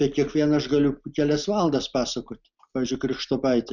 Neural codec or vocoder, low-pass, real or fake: none; 7.2 kHz; real